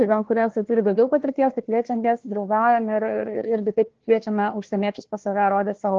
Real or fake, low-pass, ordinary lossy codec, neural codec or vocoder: fake; 7.2 kHz; Opus, 16 kbps; codec, 16 kHz, 1 kbps, FunCodec, trained on Chinese and English, 50 frames a second